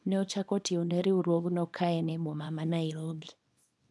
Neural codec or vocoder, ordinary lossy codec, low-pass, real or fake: codec, 24 kHz, 0.9 kbps, WavTokenizer, small release; none; none; fake